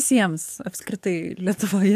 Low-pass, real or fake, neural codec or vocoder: 14.4 kHz; fake; codec, 44.1 kHz, 7.8 kbps, DAC